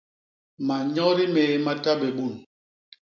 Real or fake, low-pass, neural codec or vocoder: real; 7.2 kHz; none